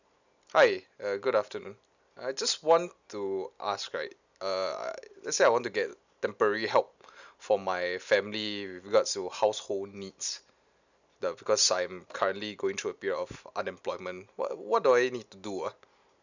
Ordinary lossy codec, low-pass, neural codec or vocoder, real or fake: none; 7.2 kHz; none; real